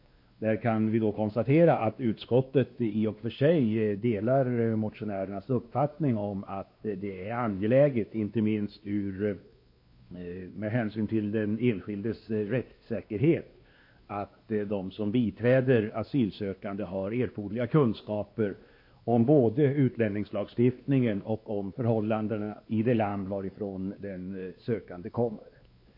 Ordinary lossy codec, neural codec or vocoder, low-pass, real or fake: MP3, 32 kbps; codec, 16 kHz, 2 kbps, X-Codec, WavLM features, trained on Multilingual LibriSpeech; 5.4 kHz; fake